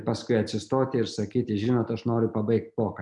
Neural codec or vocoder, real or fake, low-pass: none; real; 10.8 kHz